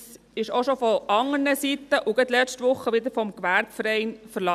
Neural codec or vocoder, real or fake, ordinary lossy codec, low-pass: none; real; none; 14.4 kHz